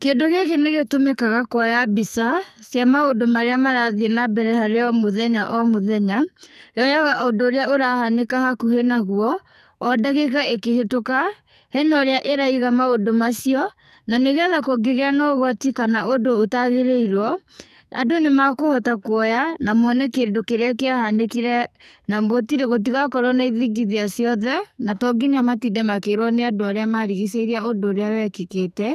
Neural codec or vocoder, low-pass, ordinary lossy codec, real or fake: codec, 44.1 kHz, 2.6 kbps, SNAC; 14.4 kHz; none; fake